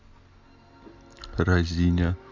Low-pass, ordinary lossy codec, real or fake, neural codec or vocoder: 7.2 kHz; none; real; none